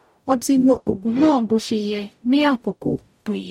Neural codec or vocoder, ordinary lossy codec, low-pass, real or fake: codec, 44.1 kHz, 0.9 kbps, DAC; MP3, 64 kbps; 19.8 kHz; fake